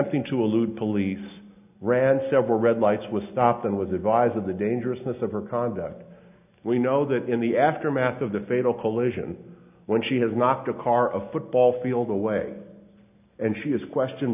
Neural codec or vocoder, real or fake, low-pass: none; real; 3.6 kHz